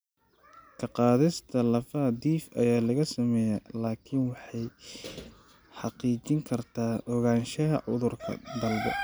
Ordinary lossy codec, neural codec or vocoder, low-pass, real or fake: none; none; none; real